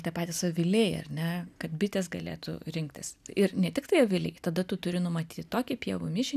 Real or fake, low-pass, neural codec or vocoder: real; 14.4 kHz; none